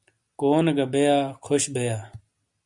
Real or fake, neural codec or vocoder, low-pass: real; none; 10.8 kHz